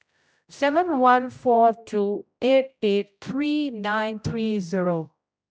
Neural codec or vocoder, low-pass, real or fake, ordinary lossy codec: codec, 16 kHz, 0.5 kbps, X-Codec, HuBERT features, trained on general audio; none; fake; none